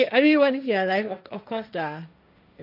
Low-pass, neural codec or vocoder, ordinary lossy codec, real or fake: 5.4 kHz; codec, 16 kHz, 1.1 kbps, Voila-Tokenizer; none; fake